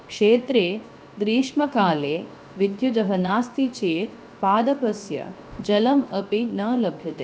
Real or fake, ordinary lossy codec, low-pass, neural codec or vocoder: fake; none; none; codec, 16 kHz, 0.7 kbps, FocalCodec